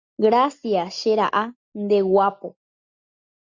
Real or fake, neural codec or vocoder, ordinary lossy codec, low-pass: real; none; MP3, 64 kbps; 7.2 kHz